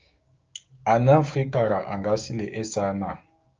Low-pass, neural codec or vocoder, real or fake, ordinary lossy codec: 7.2 kHz; codec, 16 kHz, 6 kbps, DAC; fake; Opus, 24 kbps